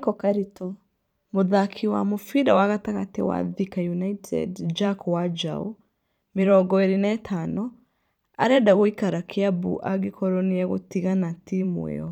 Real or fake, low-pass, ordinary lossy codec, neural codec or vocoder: fake; 19.8 kHz; none; vocoder, 44.1 kHz, 128 mel bands every 512 samples, BigVGAN v2